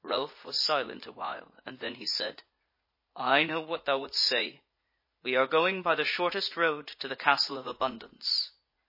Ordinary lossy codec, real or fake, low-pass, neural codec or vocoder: MP3, 24 kbps; fake; 5.4 kHz; vocoder, 44.1 kHz, 80 mel bands, Vocos